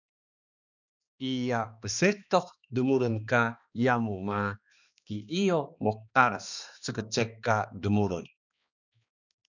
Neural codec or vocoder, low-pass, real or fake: codec, 16 kHz, 2 kbps, X-Codec, HuBERT features, trained on balanced general audio; 7.2 kHz; fake